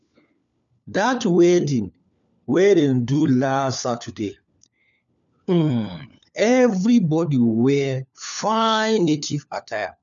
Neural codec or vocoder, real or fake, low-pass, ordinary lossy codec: codec, 16 kHz, 4 kbps, FunCodec, trained on LibriTTS, 50 frames a second; fake; 7.2 kHz; none